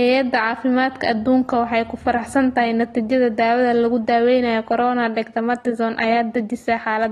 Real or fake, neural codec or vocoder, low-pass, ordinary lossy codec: real; none; 19.8 kHz; AAC, 32 kbps